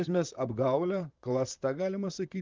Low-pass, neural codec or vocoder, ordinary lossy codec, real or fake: 7.2 kHz; vocoder, 44.1 kHz, 128 mel bands, Pupu-Vocoder; Opus, 32 kbps; fake